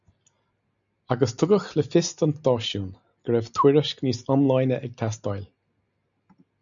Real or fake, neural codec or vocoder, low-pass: real; none; 7.2 kHz